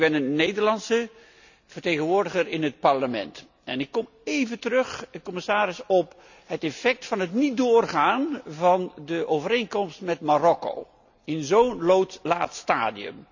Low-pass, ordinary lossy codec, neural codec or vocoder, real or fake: 7.2 kHz; none; none; real